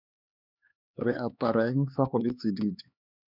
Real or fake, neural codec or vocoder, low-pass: fake; codec, 16 kHz, 4 kbps, X-Codec, HuBERT features, trained on LibriSpeech; 5.4 kHz